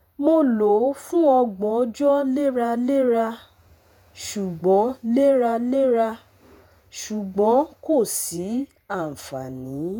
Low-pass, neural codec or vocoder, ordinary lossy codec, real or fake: none; vocoder, 48 kHz, 128 mel bands, Vocos; none; fake